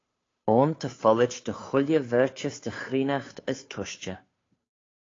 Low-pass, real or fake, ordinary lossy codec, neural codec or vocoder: 7.2 kHz; fake; AAC, 32 kbps; codec, 16 kHz, 2 kbps, FunCodec, trained on Chinese and English, 25 frames a second